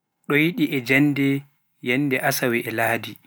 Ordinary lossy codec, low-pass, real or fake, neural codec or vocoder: none; none; real; none